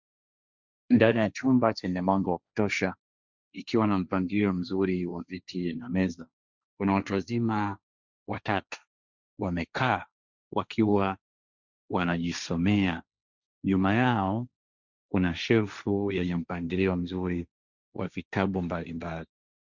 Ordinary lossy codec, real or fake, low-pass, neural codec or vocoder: Opus, 64 kbps; fake; 7.2 kHz; codec, 16 kHz, 1.1 kbps, Voila-Tokenizer